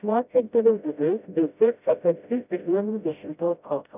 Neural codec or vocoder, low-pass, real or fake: codec, 16 kHz, 0.5 kbps, FreqCodec, smaller model; 3.6 kHz; fake